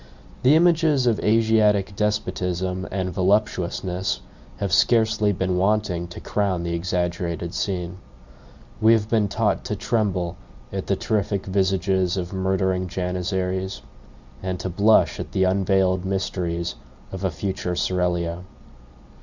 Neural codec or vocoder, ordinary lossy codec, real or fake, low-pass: none; Opus, 64 kbps; real; 7.2 kHz